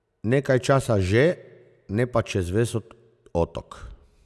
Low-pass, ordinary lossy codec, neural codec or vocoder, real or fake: none; none; none; real